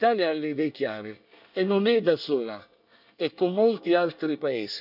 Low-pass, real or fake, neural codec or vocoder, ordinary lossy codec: 5.4 kHz; fake; codec, 24 kHz, 1 kbps, SNAC; none